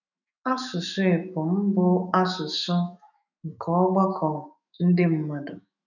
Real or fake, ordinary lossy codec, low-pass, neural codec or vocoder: fake; none; 7.2 kHz; autoencoder, 48 kHz, 128 numbers a frame, DAC-VAE, trained on Japanese speech